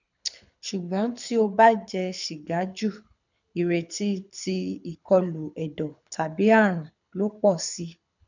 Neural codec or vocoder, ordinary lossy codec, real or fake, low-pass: codec, 24 kHz, 6 kbps, HILCodec; none; fake; 7.2 kHz